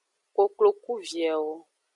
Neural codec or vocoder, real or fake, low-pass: none; real; 10.8 kHz